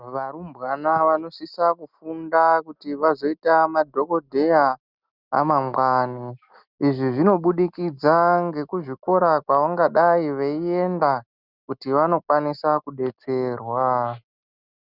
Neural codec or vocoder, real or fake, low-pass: none; real; 5.4 kHz